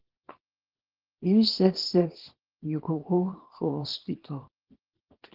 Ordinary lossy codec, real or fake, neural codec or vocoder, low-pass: Opus, 16 kbps; fake; codec, 24 kHz, 0.9 kbps, WavTokenizer, small release; 5.4 kHz